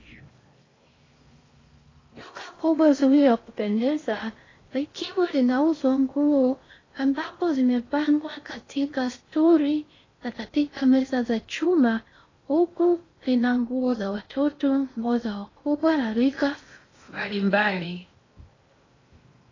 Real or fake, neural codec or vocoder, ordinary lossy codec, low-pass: fake; codec, 16 kHz in and 24 kHz out, 0.6 kbps, FocalCodec, streaming, 4096 codes; AAC, 32 kbps; 7.2 kHz